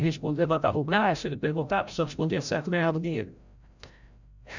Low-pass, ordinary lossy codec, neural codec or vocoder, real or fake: 7.2 kHz; none; codec, 16 kHz, 0.5 kbps, FreqCodec, larger model; fake